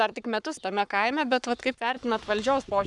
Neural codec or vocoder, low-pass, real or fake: codec, 44.1 kHz, 7.8 kbps, Pupu-Codec; 10.8 kHz; fake